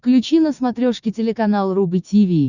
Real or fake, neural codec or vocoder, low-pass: fake; codec, 16 kHz, 6 kbps, DAC; 7.2 kHz